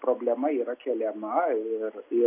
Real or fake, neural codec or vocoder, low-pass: real; none; 3.6 kHz